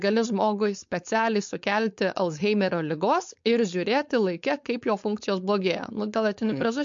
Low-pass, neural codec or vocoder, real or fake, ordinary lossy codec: 7.2 kHz; codec, 16 kHz, 4.8 kbps, FACodec; fake; MP3, 48 kbps